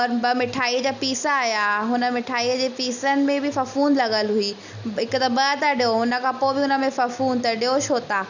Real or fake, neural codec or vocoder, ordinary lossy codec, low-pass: real; none; none; 7.2 kHz